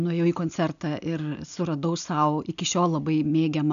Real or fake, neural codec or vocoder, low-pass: real; none; 7.2 kHz